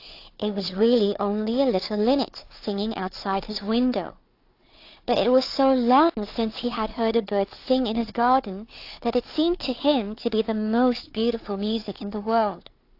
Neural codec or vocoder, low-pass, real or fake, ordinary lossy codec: codec, 16 kHz, 4 kbps, FunCodec, trained on Chinese and English, 50 frames a second; 5.4 kHz; fake; AAC, 32 kbps